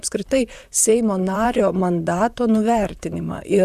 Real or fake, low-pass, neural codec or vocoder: fake; 14.4 kHz; vocoder, 44.1 kHz, 128 mel bands, Pupu-Vocoder